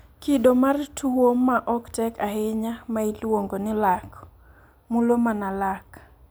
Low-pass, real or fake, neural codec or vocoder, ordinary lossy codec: none; real; none; none